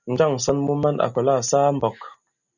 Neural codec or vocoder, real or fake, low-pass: none; real; 7.2 kHz